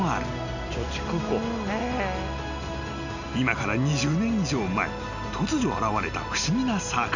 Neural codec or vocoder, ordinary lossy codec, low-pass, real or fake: none; none; 7.2 kHz; real